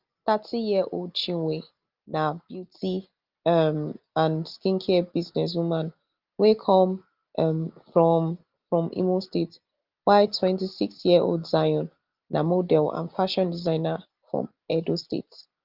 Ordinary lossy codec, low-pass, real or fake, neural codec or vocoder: Opus, 24 kbps; 5.4 kHz; real; none